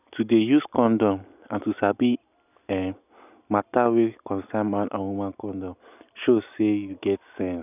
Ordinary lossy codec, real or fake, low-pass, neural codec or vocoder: none; real; 3.6 kHz; none